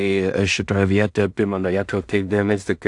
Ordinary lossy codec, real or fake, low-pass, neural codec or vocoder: AAC, 64 kbps; fake; 10.8 kHz; codec, 16 kHz in and 24 kHz out, 0.4 kbps, LongCat-Audio-Codec, two codebook decoder